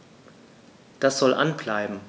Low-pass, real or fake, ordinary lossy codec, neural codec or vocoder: none; real; none; none